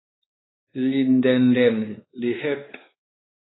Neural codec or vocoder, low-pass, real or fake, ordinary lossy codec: codec, 16 kHz, 2 kbps, X-Codec, WavLM features, trained on Multilingual LibriSpeech; 7.2 kHz; fake; AAC, 16 kbps